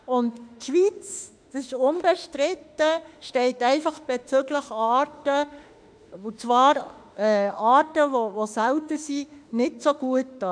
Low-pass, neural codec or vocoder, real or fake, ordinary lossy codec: 9.9 kHz; autoencoder, 48 kHz, 32 numbers a frame, DAC-VAE, trained on Japanese speech; fake; none